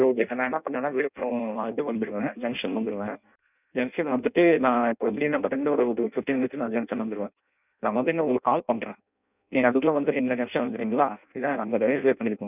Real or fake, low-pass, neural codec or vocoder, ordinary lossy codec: fake; 3.6 kHz; codec, 16 kHz in and 24 kHz out, 0.6 kbps, FireRedTTS-2 codec; none